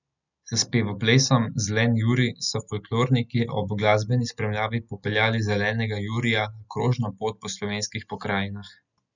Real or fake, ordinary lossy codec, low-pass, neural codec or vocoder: real; none; 7.2 kHz; none